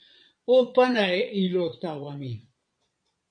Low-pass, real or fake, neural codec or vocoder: 9.9 kHz; fake; vocoder, 22.05 kHz, 80 mel bands, Vocos